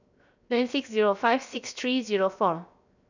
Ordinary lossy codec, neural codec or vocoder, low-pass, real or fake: none; codec, 16 kHz, 0.7 kbps, FocalCodec; 7.2 kHz; fake